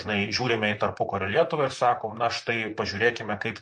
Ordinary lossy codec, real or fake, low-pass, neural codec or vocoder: MP3, 48 kbps; fake; 10.8 kHz; vocoder, 44.1 kHz, 128 mel bands, Pupu-Vocoder